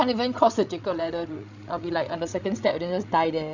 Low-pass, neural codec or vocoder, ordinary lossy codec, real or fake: 7.2 kHz; codec, 16 kHz, 16 kbps, FreqCodec, larger model; none; fake